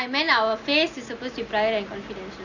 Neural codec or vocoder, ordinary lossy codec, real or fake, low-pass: none; none; real; 7.2 kHz